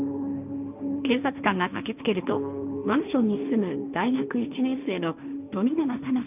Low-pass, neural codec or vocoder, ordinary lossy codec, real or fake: 3.6 kHz; codec, 16 kHz, 1.1 kbps, Voila-Tokenizer; none; fake